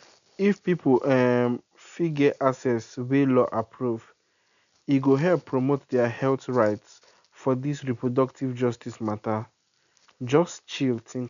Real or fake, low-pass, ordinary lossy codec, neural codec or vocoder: real; 7.2 kHz; none; none